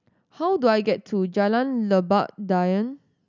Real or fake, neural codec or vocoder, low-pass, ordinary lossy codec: real; none; 7.2 kHz; none